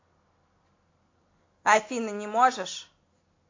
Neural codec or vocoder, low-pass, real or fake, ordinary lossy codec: none; 7.2 kHz; real; AAC, 32 kbps